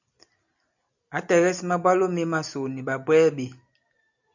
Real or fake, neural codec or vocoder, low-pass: real; none; 7.2 kHz